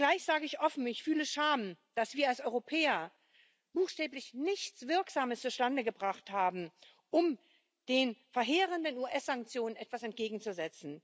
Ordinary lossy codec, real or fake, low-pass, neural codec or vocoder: none; real; none; none